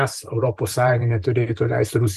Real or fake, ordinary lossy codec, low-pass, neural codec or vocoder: fake; Opus, 24 kbps; 14.4 kHz; vocoder, 44.1 kHz, 128 mel bands every 512 samples, BigVGAN v2